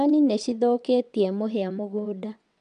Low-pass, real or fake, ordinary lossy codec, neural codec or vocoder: 9.9 kHz; fake; none; vocoder, 22.05 kHz, 80 mel bands, WaveNeXt